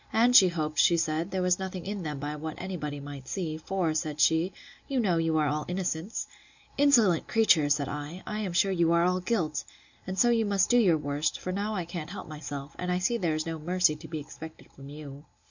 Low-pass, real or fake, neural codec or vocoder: 7.2 kHz; real; none